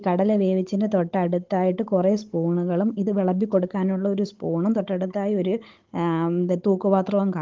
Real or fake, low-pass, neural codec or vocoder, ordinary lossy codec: fake; 7.2 kHz; codec, 16 kHz, 16 kbps, FunCodec, trained on Chinese and English, 50 frames a second; Opus, 16 kbps